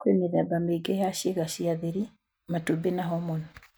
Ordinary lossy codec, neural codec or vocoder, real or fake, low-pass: none; none; real; none